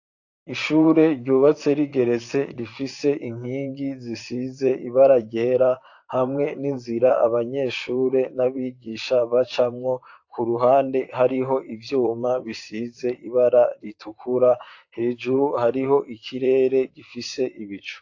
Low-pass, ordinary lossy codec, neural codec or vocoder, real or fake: 7.2 kHz; AAC, 48 kbps; vocoder, 44.1 kHz, 128 mel bands, Pupu-Vocoder; fake